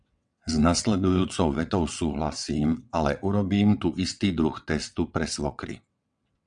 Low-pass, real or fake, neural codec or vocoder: 9.9 kHz; fake; vocoder, 22.05 kHz, 80 mel bands, WaveNeXt